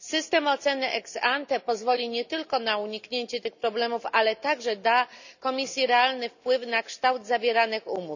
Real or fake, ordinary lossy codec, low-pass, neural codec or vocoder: real; none; 7.2 kHz; none